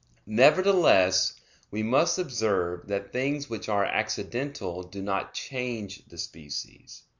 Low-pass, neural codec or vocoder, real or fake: 7.2 kHz; none; real